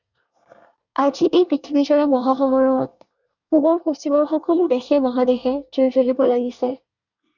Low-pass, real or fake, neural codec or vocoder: 7.2 kHz; fake; codec, 24 kHz, 1 kbps, SNAC